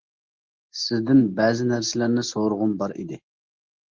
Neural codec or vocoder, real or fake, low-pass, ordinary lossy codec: none; real; 7.2 kHz; Opus, 16 kbps